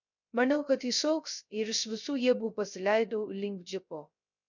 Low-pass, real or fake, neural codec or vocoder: 7.2 kHz; fake; codec, 16 kHz, 0.3 kbps, FocalCodec